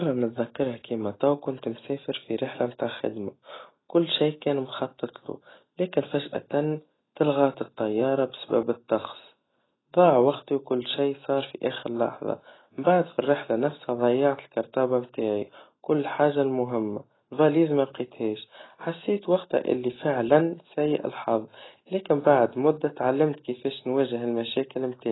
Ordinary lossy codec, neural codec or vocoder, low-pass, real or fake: AAC, 16 kbps; none; 7.2 kHz; real